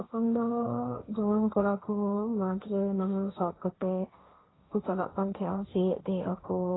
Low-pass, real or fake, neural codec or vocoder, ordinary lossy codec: 7.2 kHz; fake; codec, 16 kHz, 1.1 kbps, Voila-Tokenizer; AAC, 16 kbps